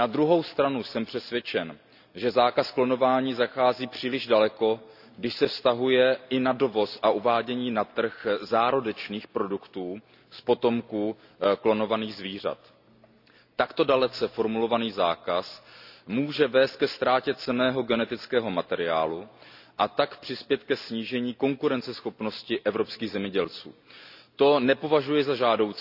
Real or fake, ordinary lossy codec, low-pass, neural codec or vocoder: real; none; 5.4 kHz; none